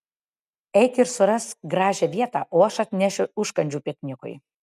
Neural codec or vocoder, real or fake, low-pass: none; real; 14.4 kHz